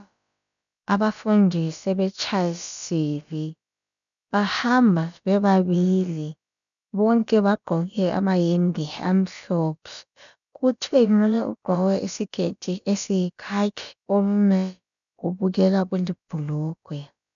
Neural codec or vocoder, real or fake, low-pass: codec, 16 kHz, about 1 kbps, DyCAST, with the encoder's durations; fake; 7.2 kHz